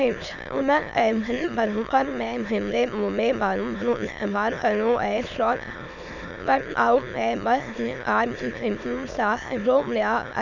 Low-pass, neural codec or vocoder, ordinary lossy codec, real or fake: 7.2 kHz; autoencoder, 22.05 kHz, a latent of 192 numbers a frame, VITS, trained on many speakers; none; fake